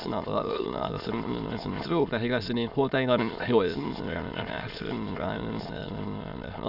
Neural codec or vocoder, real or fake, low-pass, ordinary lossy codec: autoencoder, 22.05 kHz, a latent of 192 numbers a frame, VITS, trained on many speakers; fake; 5.4 kHz; none